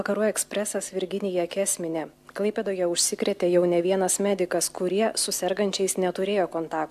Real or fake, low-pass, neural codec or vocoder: real; 14.4 kHz; none